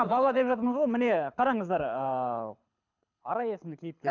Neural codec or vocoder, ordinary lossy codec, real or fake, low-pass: codec, 24 kHz, 6 kbps, HILCodec; none; fake; 7.2 kHz